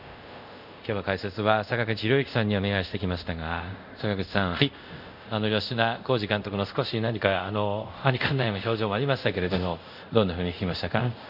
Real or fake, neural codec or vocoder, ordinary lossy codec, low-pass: fake; codec, 24 kHz, 0.5 kbps, DualCodec; none; 5.4 kHz